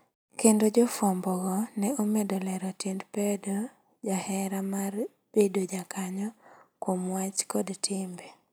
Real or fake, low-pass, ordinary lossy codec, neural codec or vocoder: real; none; none; none